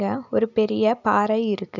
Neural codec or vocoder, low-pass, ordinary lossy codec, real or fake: none; 7.2 kHz; none; real